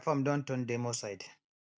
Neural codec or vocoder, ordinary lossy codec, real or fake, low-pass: none; none; real; none